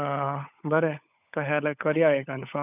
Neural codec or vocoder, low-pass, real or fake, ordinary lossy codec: codec, 16 kHz, 16 kbps, FunCodec, trained on LibriTTS, 50 frames a second; 3.6 kHz; fake; none